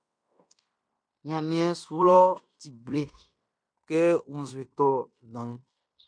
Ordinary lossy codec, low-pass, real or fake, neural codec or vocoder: MP3, 64 kbps; 9.9 kHz; fake; codec, 16 kHz in and 24 kHz out, 0.9 kbps, LongCat-Audio-Codec, fine tuned four codebook decoder